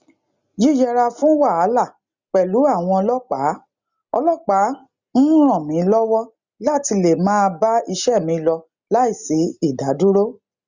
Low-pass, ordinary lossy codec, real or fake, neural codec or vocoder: 7.2 kHz; Opus, 64 kbps; real; none